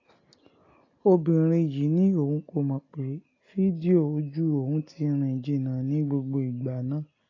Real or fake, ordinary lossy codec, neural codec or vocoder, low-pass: real; AAC, 32 kbps; none; 7.2 kHz